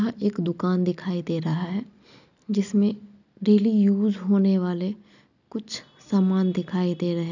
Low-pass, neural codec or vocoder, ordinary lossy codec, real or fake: 7.2 kHz; none; none; real